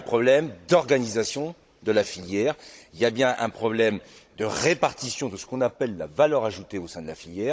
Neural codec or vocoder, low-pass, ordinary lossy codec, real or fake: codec, 16 kHz, 16 kbps, FunCodec, trained on Chinese and English, 50 frames a second; none; none; fake